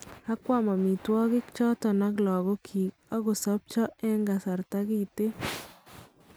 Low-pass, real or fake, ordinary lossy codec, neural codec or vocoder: none; real; none; none